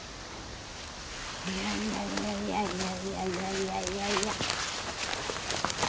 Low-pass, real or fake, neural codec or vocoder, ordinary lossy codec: none; real; none; none